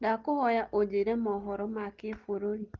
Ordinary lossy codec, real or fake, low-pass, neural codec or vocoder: Opus, 16 kbps; real; 7.2 kHz; none